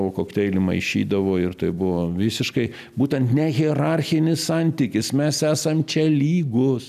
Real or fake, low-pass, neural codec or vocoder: real; 14.4 kHz; none